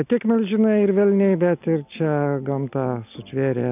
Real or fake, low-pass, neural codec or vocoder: real; 3.6 kHz; none